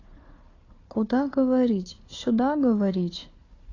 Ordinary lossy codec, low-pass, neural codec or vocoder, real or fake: AAC, 32 kbps; 7.2 kHz; codec, 16 kHz, 4 kbps, FunCodec, trained on Chinese and English, 50 frames a second; fake